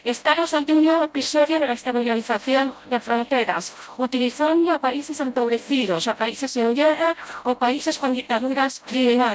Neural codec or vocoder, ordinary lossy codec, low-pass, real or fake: codec, 16 kHz, 0.5 kbps, FreqCodec, smaller model; none; none; fake